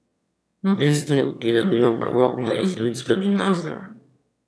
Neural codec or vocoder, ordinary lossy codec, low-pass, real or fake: autoencoder, 22.05 kHz, a latent of 192 numbers a frame, VITS, trained on one speaker; none; none; fake